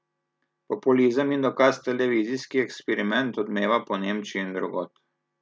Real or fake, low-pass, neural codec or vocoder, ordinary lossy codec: real; none; none; none